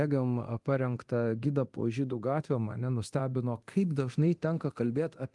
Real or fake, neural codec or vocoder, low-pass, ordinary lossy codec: fake; codec, 24 kHz, 0.9 kbps, DualCodec; 10.8 kHz; Opus, 24 kbps